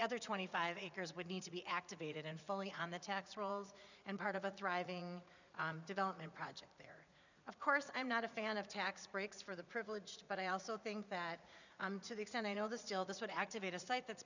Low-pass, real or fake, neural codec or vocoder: 7.2 kHz; fake; vocoder, 44.1 kHz, 80 mel bands, Vocos